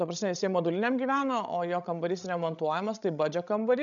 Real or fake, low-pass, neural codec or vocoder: fake; 7.2 kHz; codec, 16 kHz, 16 kbps, FreqCodec, larger model